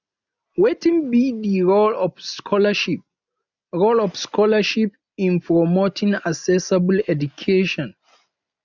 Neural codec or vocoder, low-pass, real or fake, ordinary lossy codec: none; 7.2 kHz; real; none